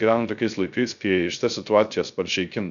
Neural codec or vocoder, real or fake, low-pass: codec, 16 kHz, 0.3 kbps, FocalCodec; fake; 7.2 kHz